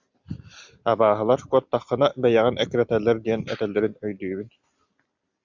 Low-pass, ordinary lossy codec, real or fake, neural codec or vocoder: 7.2 kHz; Opus, 64 kbps; real; none